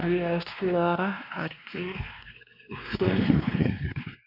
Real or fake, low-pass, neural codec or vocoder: fake; 5.4 kHz; codec, 16 kHz, 2 kbps, X-Codec, WavLM features, trained on Multilingual LibriSpeech